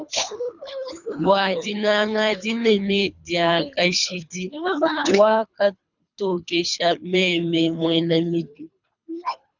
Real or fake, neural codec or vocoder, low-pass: fake; codec, 24 kHz, 3 kbps, HILCodec; 7.2 kHz